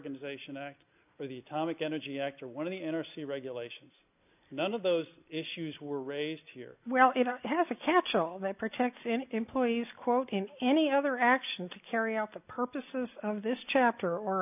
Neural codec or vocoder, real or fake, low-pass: none; real; 3.6 kHz